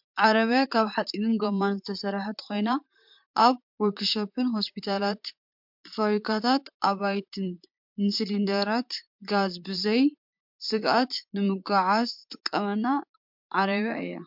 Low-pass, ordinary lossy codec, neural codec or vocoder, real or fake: 5.4 kHz; MP3, 48 kbps; vocoder, 44.1 kHz, 128 mel bands, Pupu-Vocoder; fake